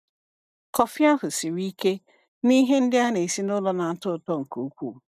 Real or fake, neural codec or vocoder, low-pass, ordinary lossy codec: real; none; 14.4 kHz; none